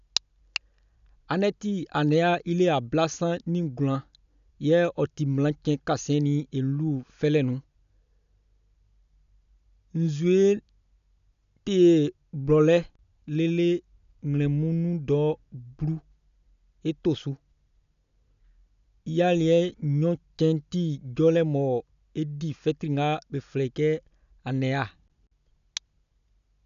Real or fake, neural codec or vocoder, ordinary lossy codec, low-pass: real; none; none; 7.2 kHz